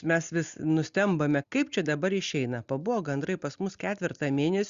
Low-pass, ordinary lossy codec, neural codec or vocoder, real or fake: 7.2 kHz; Opus, 64 kbps; none; real